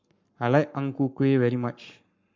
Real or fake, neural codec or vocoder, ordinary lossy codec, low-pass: fake; codec, 44.1 kHz, 7.8 kbps, Pupu-Codec; MP3, 48 kbps; 7.2 kHz